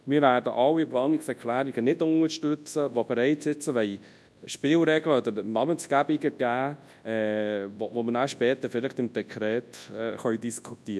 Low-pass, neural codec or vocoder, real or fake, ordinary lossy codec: none; codec, 24 kHz, 0.9 kbps, WavTokenizer, large speech release; fake; none